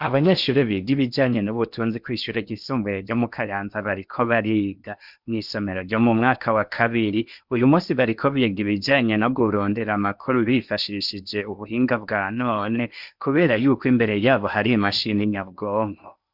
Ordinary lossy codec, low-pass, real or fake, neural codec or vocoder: Opus, 64 kbps; 5.4 kHz; fake; codec, 16 kHz in and 24 kHz out, 0.8 kbps, FocalCodec, streaming, 65536 codes